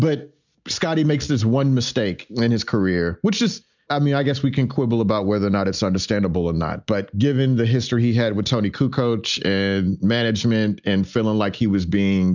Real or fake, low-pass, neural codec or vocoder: real; 7.2 kHz; none